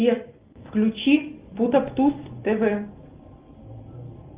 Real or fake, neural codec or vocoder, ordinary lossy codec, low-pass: real; none; Opus, 32 kbps; 3.6 kHz